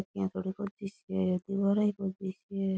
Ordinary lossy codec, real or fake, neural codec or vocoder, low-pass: none; real; none; none